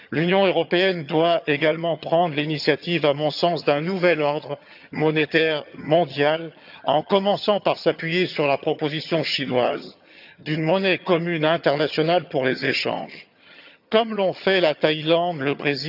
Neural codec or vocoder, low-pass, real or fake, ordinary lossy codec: vocoder, 22.05 kHz, 80 mel bands, HiFi-GAN; 5.4 kHz; fake; none